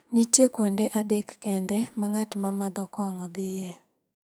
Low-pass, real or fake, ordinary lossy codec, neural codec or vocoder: none; fake; none; codec, 44.1 kHz, 2.6 kbps, SNAC